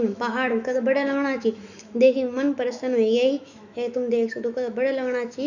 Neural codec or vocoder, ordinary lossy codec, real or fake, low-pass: autoencoder, 48 kHz, 128 numbers a frame, DAC-VAE, trained on Japanese speech; none; fake; 7.2 kHz